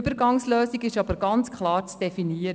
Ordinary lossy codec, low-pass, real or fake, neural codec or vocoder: none; none; real; none